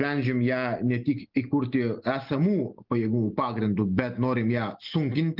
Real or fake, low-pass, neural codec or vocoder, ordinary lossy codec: real; 5.4 kHz; none; Opus, 32 kbps